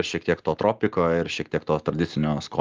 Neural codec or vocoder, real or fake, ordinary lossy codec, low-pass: none; real; Opus, 24 kbps; 7.2 kHz